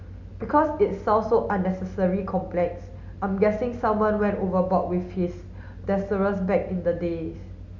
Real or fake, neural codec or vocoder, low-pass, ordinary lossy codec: real; none; 7.2 kHz; Opus, 64 kbps